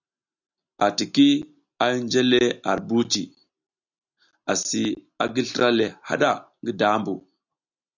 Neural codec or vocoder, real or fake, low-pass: none; real; 7.2 kHz